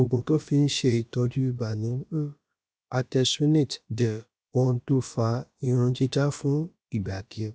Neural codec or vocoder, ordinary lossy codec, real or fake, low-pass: codec, 16 kHz, about 1 kbps, DyCAST, with the encoder's durations; none; fake; none